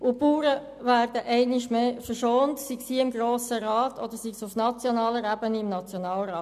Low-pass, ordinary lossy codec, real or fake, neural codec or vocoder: 14.4 kHz; none; real; none